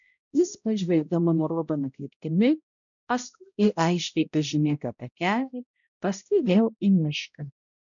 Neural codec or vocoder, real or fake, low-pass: codec, 16 kHz, 0.5 kbps, X-Codec, HuBERT features, trained on balanced general audio; fake; 7.2 kHz